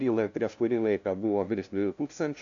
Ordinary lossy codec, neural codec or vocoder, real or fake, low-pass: MP3, 64 kbps; codec, 16 kHz, 0.5 kbps, FunCodec, trained on LibriTTS, 25 frames a second; fake; 7.2 kHz